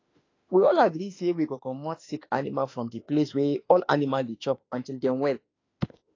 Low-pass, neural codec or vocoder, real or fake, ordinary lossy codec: 7.2 kHz; autoencoder, 48 kHz, 32 numbers a frame, DAC-VAE, trained on Japanese speech; fake; AAC, 32 kbps